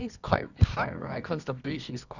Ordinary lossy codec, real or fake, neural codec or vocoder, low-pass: none; fake; codec, 24 kHz, 0.9 kbps, WavTokenizer, medium music audio release; 7.2 kHz